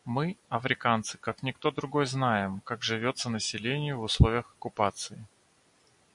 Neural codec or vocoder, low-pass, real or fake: none; 10.8 kHz; real